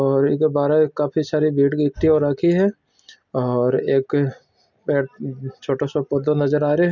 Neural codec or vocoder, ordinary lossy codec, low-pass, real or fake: none; none; 7.2 kHz; real